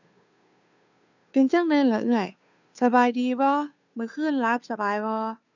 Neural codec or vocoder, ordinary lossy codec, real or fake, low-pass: codec, 16 kHz, 2 kbps, FunCodec, trained on Chinese and English, 25 frames a second; none; fake; 7.2 kHz